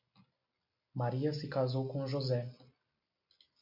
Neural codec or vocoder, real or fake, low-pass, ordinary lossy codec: none; real; 5.4 kHz; MP3, 48 kbps